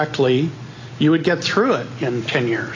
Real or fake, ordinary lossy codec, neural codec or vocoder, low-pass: real; AAC, 32 kbps; none; 7.2 kHz